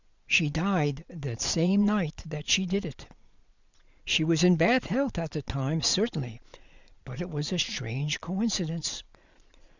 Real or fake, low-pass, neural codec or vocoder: fake; 7.2 kHz; vocoder, 22.05 kHz, 80 mel bands, Vocos